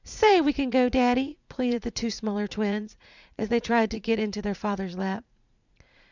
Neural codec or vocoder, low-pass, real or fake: vocoder, 44.1 kHz, 80 mel bands, Vocos; 7.2 kHz; fake